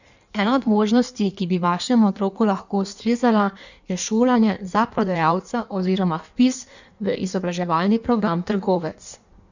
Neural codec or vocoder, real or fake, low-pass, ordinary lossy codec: codec, 16 kHz in and 24 kHz out, 1.1 kbps, FireRedTTS-2 codec; fake; 7.2 kHz; none